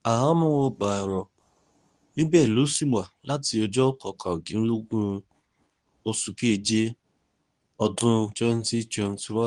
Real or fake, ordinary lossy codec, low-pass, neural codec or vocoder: fake; Opus, 32 kbps; 10.8 kHz; codec, 24 kHz, 0.9 kbps, WavTokenizer, medium speech release version 2